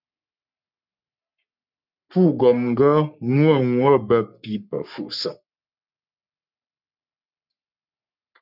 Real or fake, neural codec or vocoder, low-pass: fake; codec, 44.1 kHz, 3.4 kbps, Pupu-Codec; 5.4 kHz